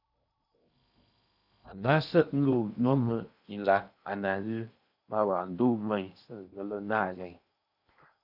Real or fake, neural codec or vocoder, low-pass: fake; codec, 16 kHz in and 24 kHz out, 0.8 kbps, FocalCodec, streaming, 65536 codes; 5.4 kHz